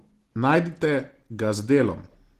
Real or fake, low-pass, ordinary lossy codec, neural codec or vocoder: real; 14.4 kHz; Opus, 16 kbps; none